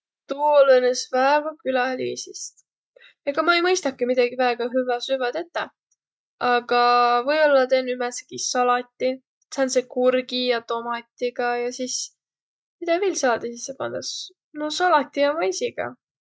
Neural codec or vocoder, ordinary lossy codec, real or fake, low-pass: none; none; real; none